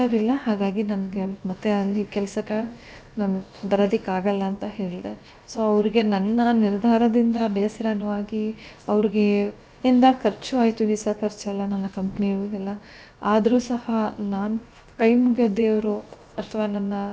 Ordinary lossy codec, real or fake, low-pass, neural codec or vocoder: none; fake; none; codec, 16 kHz, about 1 kbps, DyCAST, with the encoder's durations